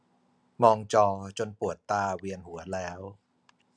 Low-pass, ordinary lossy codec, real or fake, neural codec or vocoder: none; none; real; none